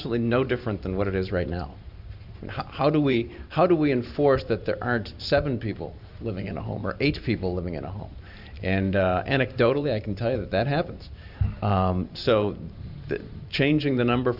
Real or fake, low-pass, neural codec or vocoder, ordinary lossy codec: fake; 5.4 kHz; vocoder, 44.1 kHz, 128 mel bands every 512 samples, BigVGAN v2; Opus, 64 kbps